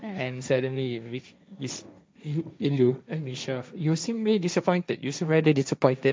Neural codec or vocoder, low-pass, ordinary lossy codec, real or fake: codec, 16 kHz, 1.1 kbps, Voila-Tokenizer; none; none; fake